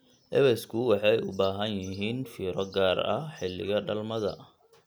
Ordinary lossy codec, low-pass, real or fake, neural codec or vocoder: none; none; real; none